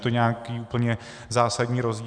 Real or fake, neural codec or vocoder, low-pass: real; none; 9.9 kHz